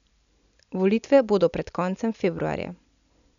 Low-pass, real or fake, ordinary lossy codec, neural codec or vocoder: 7.2 kHz; real; none; none